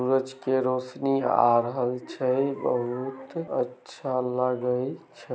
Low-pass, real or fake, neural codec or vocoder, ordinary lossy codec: none; real; none; none